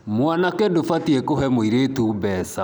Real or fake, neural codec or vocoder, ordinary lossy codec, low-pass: real; none; none; none